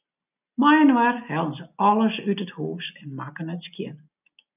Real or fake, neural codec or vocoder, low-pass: real; none; 3.6 kHz